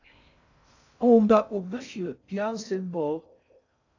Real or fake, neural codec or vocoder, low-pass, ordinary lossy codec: fake; codec, 16 kHz in and 24 kHz out, 0.6 kbps, FocalCodec, streaming, 4096 codes; 7.2 kHz; AAC, 48 kbps